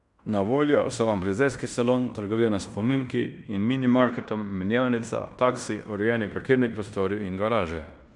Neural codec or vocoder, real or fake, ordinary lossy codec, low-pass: codec, 16 kHz in and 24 kHz out, 0.9 kbps, LongCat-Audio-Codec, fine tuned four codebook decoder; fake; none; 10.8 kHz